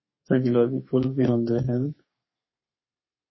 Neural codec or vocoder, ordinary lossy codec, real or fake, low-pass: codec, 32 kHz, 1.9 kbps, SNAC; MP3, 24 kbps; fake; 7.2 kHz